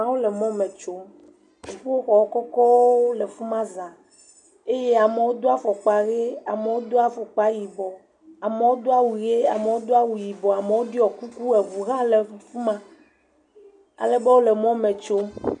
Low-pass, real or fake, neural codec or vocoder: 10.8 kHz; real; none